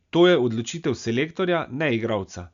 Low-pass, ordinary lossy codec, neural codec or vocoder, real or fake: 7.2 kHz; MP3, 64 kbps; none; real